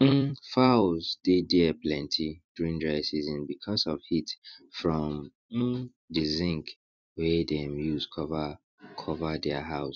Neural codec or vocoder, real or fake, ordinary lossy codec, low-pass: vocoder, 44.1 kHz, 128 mel bands every 256 samples, BigVGAN v2; fake; none; 7.2 kHz